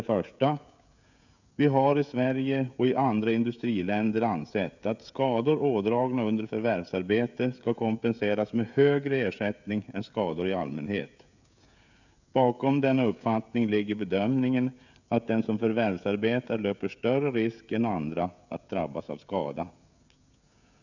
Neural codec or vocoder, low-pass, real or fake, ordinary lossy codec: codec, 16 kHz, 16 kbps, FreqCodec, smaller model; 7.2 kHz; fake; none